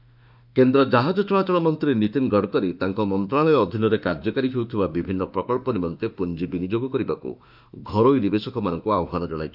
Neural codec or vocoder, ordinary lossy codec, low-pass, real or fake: autoencoder, 48 kHz, 32 numbers a frame, DAC-VAE, trained on Japanese speech; none; 5.4 kHz; fake